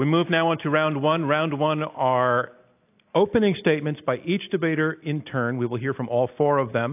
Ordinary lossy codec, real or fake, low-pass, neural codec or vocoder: AAC, 32 kbps; real; 3.6 kHz; none